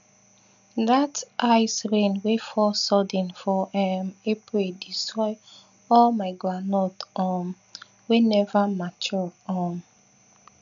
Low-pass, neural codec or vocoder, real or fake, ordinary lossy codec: 7.2 kHz; none; real; none